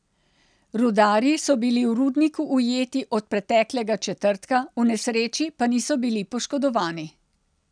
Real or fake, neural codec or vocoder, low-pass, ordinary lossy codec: real; none; 9.9 kHz; none